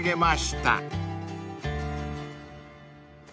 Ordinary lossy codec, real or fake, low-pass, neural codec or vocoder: none; real; none; none